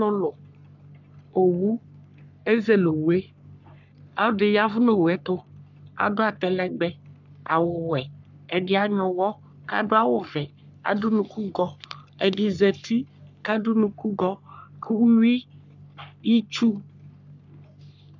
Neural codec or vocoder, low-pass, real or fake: codec, 44.1 kHz, 3.4 kbps, Pupu-Codec; 7.2 kHz; fake